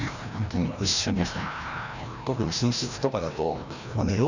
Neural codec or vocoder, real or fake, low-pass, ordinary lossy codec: codec, 16 kHz, 1 kbps, FreqCodec, larger model; fake; 7.2 kHz; none